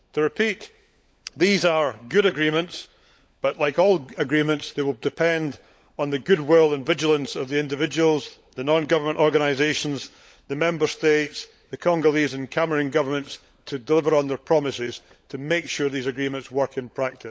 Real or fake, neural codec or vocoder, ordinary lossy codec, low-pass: fake; codec, 16 kHz, 16 kbps, FunCodec, trained on LibriTTS, 50 frames a second; none; none